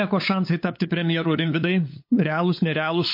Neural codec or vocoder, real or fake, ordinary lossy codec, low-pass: codec, 16 kHz, 8 kbps, FunCodec, trained on LibriTTS, 25 frames a second; fake; MP3, 32 kbps; 5.4 kHz